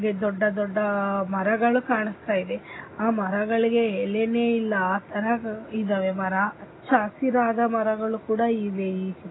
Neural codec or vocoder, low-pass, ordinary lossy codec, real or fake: none; 7.2 kHz; AAC, 16 kbps; real